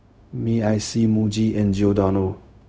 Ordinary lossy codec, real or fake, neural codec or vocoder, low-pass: none; fake; codec, 16 kHz, 0.4 kbps, LongCat-Audio-Codec; none